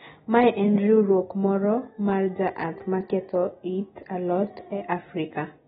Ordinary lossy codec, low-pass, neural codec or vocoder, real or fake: AAC, 16 kbps; 19.8 kHz; none; real